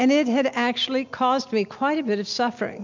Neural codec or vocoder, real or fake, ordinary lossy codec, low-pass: none; real; MP3, 64 kbps; 7.2 kHz